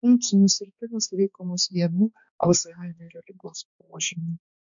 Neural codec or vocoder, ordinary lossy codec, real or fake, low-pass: codec, 16 kHz, 2 kbps, X-Codec, HuBERT features, trained on balanced general audio; MP3, 64 kbps; fake; 7.2 kHz